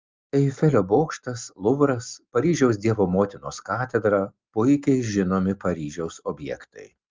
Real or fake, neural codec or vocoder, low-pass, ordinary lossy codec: real; none; 7.2 kHz; Opus, 32 kbps